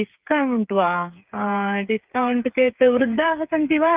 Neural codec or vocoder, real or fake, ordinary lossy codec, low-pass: codec, 16 kHz, 8 kbps, FreqCodec, smaller model; fake; Opus, 32 kbps; 3.6 kHz